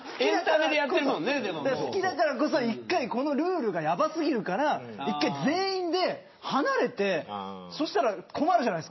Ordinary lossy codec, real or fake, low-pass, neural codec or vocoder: MP3, 24 kbps; real; 7.2 kHz; none